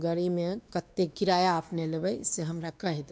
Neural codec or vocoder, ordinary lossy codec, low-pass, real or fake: codec, 16 kHz, 2 kbps, X-Codec, WavLM features, trained on Multilingual LibriSpeech; none; none; fake